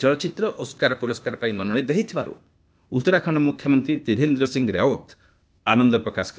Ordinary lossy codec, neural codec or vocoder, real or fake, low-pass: none; codec, 16 kHz, 0.8 kbps, ZipCodec; fake; none